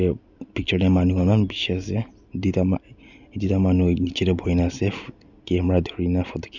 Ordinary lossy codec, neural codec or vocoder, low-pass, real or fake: none; none; 7.2 kHz; real